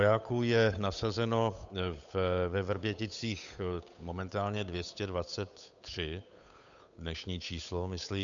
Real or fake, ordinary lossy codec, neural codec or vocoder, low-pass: fake; MP3, 96 kbps; codec, 16 kHz, 8 kbps, FunCodec, trained on Chinese and English, 25 frames a second; 7.2 kHz